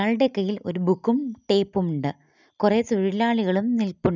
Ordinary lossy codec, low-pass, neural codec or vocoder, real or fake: none; 7.2 kHz; none; real